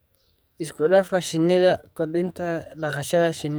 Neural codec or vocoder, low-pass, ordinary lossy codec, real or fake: codec, 44.1 kHz, 2.6 kbps, SNAC; none; none; fake